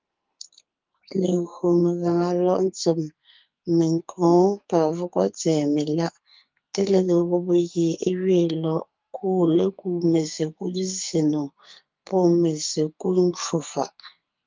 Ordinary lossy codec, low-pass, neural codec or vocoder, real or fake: Opus, 24 kbps; 7.2 kHz; codec, 44.1 kHz, 2.6 kbps, SNAC; fake